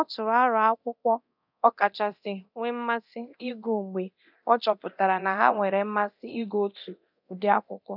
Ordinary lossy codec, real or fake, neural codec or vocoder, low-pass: none; fake; codec, 24 kHz, 0.9 kbps, DualCodec; 5.4 kHz